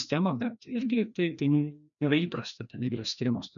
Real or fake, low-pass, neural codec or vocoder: fake; 7.2 kHz; codec, 16 kHz, 1 kbps, FreqCodec, larger model